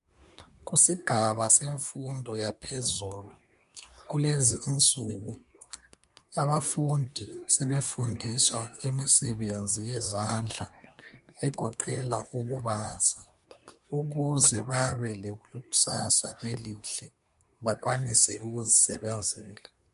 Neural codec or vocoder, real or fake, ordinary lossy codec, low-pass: codec, 24 kHz, 1 kbps, SNAC; fake; MP3, 64 kbps; 10.8 kHz